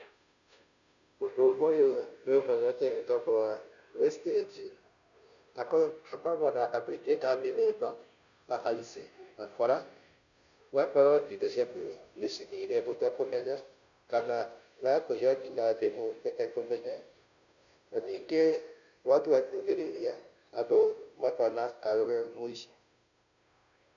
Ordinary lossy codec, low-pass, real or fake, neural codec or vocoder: none; 7.2 kHz; fake; codec, 16 kHz, 0.5 kbps, FunCodec, trained on Chinese and English, 25 frames a second